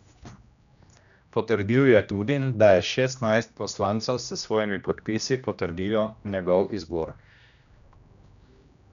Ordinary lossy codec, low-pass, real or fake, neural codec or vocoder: none; 7.2 kHz; fake; codec, 16 kHz, 1 kbps, X-Codec, HuBERT features, trained on general audio